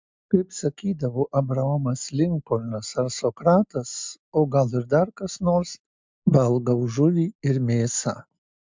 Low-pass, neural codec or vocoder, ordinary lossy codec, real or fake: 7.2 kHz; none; MP3, 64 kbps; real